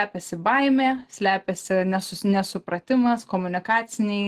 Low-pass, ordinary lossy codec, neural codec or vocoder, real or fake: 14.4 kHz; Opus, 16 kbps; none; real